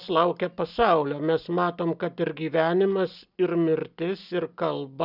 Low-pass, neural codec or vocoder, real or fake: 5.4 kHz; none; real